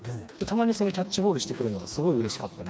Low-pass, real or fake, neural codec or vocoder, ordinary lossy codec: none; fake; codec, 16 kHz, 2 kbps, FreqCodec, smaller model; none